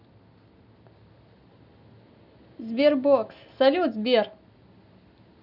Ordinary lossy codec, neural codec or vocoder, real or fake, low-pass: none; none; real; 5.4 kHz